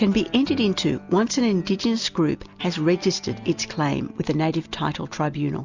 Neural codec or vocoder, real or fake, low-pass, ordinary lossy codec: none; real; 7.2 kHz; Opus, 64 kbps